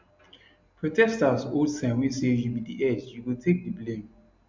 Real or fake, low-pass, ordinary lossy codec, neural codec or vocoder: real; 7.2 kHz; MP3, 64 kbps; none